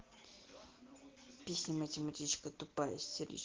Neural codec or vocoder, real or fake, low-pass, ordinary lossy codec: none; real; 7.2 kHz; Opus, 16 kbps